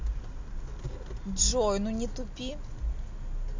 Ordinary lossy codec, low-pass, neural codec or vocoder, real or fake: MP3, 48 kbps; 7.2 kHz; none; real